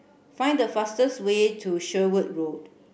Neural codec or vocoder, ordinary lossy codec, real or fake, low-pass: none; none; real; none